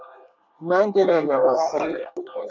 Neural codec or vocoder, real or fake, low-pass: codec, 24 kHz, 1 kbps, SNAC; fake; 7.2 kHz